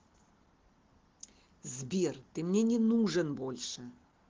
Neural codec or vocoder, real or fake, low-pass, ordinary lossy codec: none; real; 7.2 kHz; Opus, 16 kbps